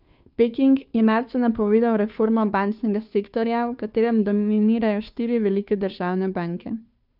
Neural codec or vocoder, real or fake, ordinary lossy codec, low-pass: codec, 16 kHz, 2 kbps, FunCodec, trained on Chinese and English, 25 frames a second; fake; none; 5.4 kHz